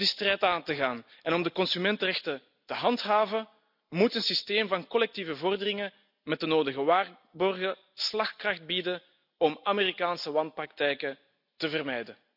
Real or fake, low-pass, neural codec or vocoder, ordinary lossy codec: real; 5.4 kHz; none; none